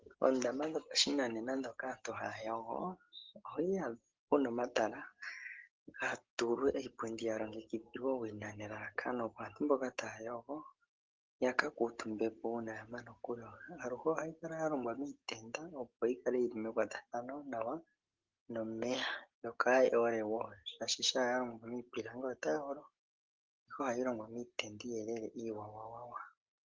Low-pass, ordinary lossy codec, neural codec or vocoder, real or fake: 7.2 kHz; Opus, 16 kbps; none; real